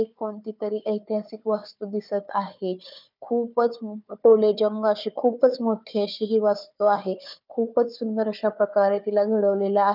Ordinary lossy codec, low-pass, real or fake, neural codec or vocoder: none; 5.4 kHz; fake; codec, 16 kHz, 4 kbps, FunCodec, trained on Chinese and English, 50 frames a second